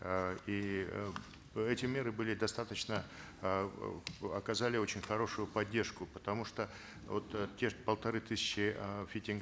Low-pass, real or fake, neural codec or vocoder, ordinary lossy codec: none; real; none; none